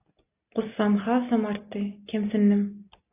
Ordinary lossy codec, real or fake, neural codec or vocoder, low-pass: AAC, 24 kbps; real; none; 3.6 kHz